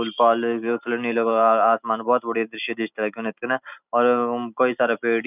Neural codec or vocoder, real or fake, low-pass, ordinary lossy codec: none; real; 3.6 kHz; none